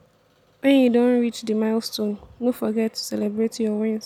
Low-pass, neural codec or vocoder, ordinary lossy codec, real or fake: 19.8 kHz; none; none; real